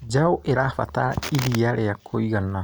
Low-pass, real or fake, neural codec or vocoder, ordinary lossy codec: none; real; none; none